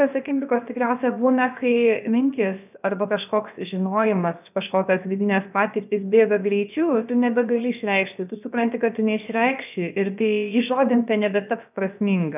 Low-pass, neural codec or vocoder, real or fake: 3.6 kHz; codec, 16 kHz, about 1 kbps, DyCAST, with the encoder's durations; fake